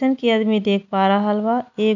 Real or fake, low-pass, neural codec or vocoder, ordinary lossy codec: real; 7.2 kHz; none; none